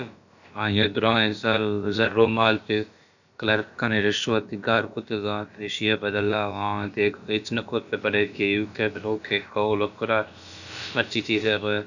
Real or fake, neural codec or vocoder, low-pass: fake; codec, 16 kHz, about 1 kbps, DyCAST, with the encoder's durations; 7.2 kHz